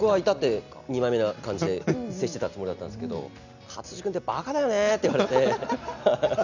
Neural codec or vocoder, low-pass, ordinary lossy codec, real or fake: none; 7.2 kHz; none; real